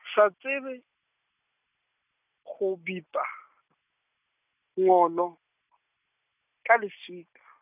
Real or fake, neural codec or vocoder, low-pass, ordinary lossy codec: real; none; 3.6 kHz; none